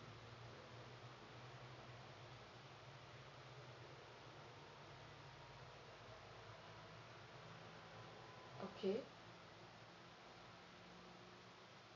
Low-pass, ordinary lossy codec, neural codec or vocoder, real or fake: 7.2 kHz; none; none; real